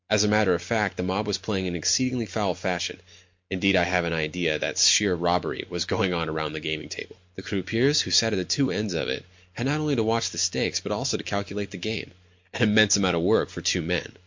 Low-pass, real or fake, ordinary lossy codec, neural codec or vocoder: 7.2 kHz; real; MP3, 48 kbps; none